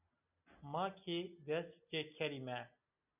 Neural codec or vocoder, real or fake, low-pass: none; real; 3.6 kHz